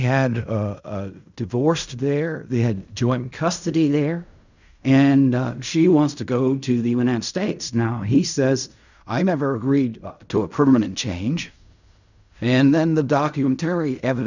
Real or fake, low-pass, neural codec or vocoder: fake; 7.2 kHz; codec, 16 kHz in and 24 kHz out, 0.4 kbps, LongCat-Audio-Codec, fine tuned four codebook decoder